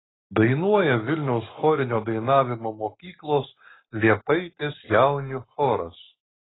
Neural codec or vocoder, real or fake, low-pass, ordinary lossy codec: codec, 44.1 kHz, 7.8 kbps, Pupu-Codec; fake; 7.2 kHz; AAC, 16 kbps